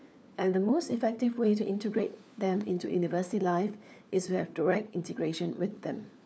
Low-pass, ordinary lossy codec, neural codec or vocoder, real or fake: none; none; codec, 16 kHz, 4 kbps, FunCodec, trained on LibriTTS, 50 frames a second; fake